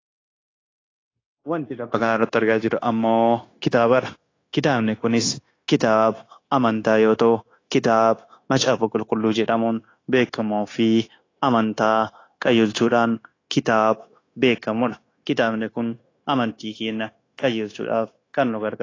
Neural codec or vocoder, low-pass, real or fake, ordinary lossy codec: codec, 16 kHz, 0.9 kbps, LongCat-Audio-Codec; 7.2 kHz; fake; AAC, 32 kbps